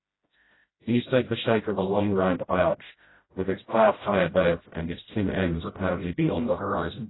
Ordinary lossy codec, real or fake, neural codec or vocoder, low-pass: AAC, 16 kbps; fake; codec, 16 kHz, 0.5 kbps, FreqCodec, smaller model; 7.2 kHz